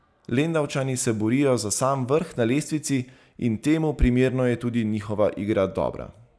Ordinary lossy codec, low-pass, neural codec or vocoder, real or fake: none; none; none; real